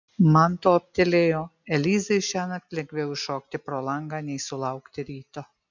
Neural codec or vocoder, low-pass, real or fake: none; 7.2 kHz; real